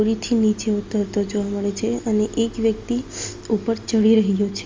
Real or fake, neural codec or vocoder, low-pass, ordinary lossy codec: real; none; 7.2 kHz; Opus, 32 kbps